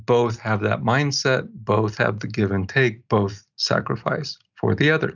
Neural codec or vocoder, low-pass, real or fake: none; 7.2 kHz; real